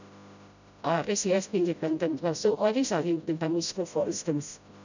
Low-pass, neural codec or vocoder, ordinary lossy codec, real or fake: 7.2 kHz; codec, 16 kHz, 0.5 kbps, FreqCodec, smaller model; none; fake